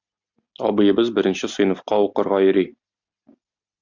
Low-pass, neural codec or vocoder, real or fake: 7.2 kHz; none; real